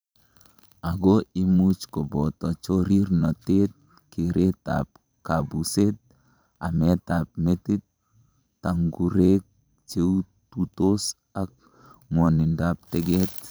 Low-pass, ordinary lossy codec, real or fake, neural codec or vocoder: none; none; fake; vocoder, 44.1 kHz, 128 mel bands every 512 samples, BigVGAN v2